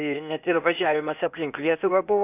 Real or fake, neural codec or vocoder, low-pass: fake; codec, 16 kHz, 0.8 kbps, ZipCodec; 3.6 kHz